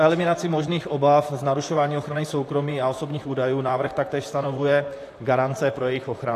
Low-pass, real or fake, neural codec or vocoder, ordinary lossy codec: 14.4 kHz; fake; vocoder, 44.1 kHz, 128 mel bands, Pupu-Vocoder; AAC, 64 kbps